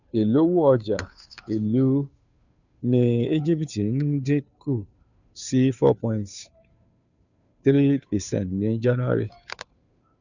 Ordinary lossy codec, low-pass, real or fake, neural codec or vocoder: none; 7.2 kHz; fake; codec, 16 kHz, 2 kbps, FunCodec, trained on Chinese and English, 25 frames a second